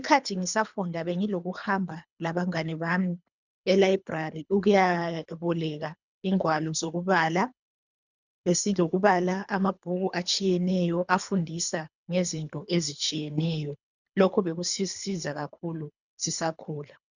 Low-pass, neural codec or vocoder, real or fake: 7.2 kHz; codec, 24 kHz, 3 kbps, HILCodec; fake